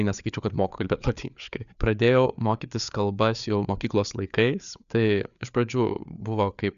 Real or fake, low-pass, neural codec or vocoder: fake; 7.2 kHz; codec, 16 kHz, 4 kbps, FreqCodec, larger model